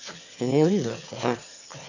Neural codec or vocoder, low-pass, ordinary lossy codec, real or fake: autoencoder, 22.05 kHz, a latent of 192 numbers a frame, VITS, trained on one speaker; 7.2 kHz; none; fake